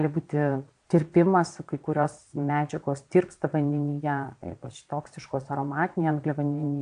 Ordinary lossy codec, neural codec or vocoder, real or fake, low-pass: AAC, 64 kbps; vocoder, 22.05 kHz, 80 mel bands, Vocos; fake; 9.9 kHz